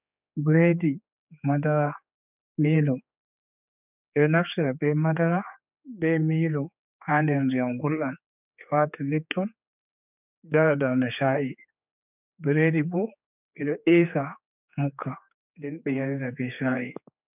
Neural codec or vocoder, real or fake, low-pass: codec, 16 kHz, 4 kbps, X-Codec, HuBERT features, trained on general audio; fake; 3.6 kHz